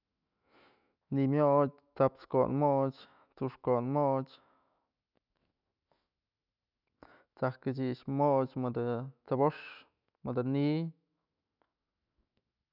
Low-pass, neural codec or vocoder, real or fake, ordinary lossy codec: 5.4 kHz; autoencoder, 48 kHz, 128 numbers a frame, DAC-VAE, trained on Japanese speech; fake; none